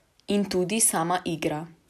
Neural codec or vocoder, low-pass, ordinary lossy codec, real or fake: none; 14.4 kHz; none; real